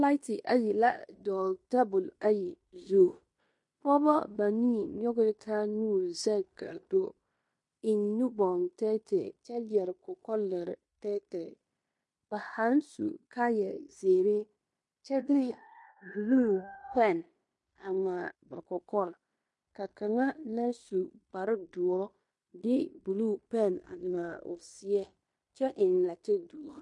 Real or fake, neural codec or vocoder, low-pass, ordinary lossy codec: fake; codec, 16 kHz in and 24 kHz out, 0.9 kbps, LongCat-Audio-Codec, fine tuned four codebook decoder; 10.8 kHz; MP3, 48 kbps